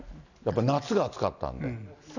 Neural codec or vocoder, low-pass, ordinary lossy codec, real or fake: none; 7.2 kHz; none; real